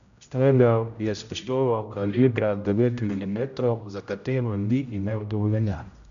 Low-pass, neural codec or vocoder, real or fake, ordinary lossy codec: 7.2 kHz; codec, 16 kHz, 0.5 kbps, X-Codec, HuBERT features, trained on general audio; fake; none